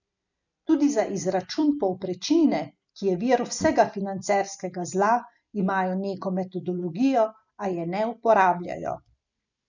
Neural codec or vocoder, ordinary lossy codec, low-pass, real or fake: none; none; 7.2 kHz; real